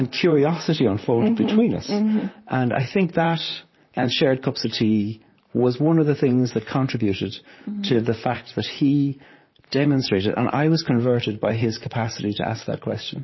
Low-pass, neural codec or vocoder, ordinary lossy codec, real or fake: 7.2 kHz; vocoder, 44.1 kHz, 128 mel bands, Pupu-Vocoder; MP3, 24 kbps; fake